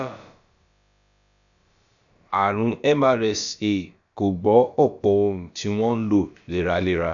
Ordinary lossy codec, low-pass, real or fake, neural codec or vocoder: none; 7.2 kHz; fake; codec, 16 kHz, about 1 kbps, DyCAST, with the encoder's durations